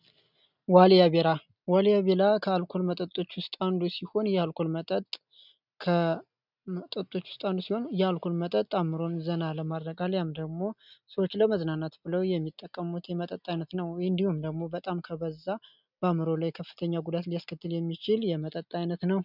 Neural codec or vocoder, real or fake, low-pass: none; real; 5.4 kHz